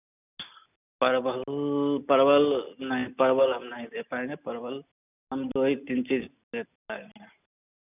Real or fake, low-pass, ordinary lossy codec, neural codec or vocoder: real; 3.6 kHz; none; none